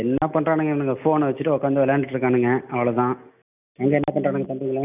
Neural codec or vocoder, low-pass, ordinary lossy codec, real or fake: none; 3.6 kHz; none; real